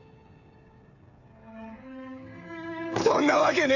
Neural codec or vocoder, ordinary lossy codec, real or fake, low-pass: codec, 16 kHz, 16 kbps, FreqCodec, smaller model; none; fake; 7.2 kHz